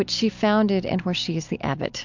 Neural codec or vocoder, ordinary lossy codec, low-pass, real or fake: none; MP3, 64 kbps; 7.2 kHz; real